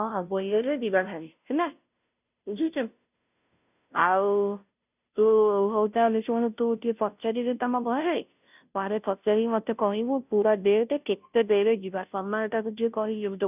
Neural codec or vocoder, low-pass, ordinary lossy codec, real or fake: codec, 16 kHz, 0.5 kbps, FunCodec, trained on Chinese and English, 25 frames a second; 3.6 kHz; none; fake